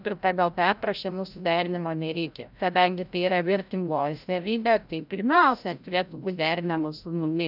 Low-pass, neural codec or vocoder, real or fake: 5.4 kHz; codec, 16 kHz, 0.5 kbps, FreqCodec, larger model; fake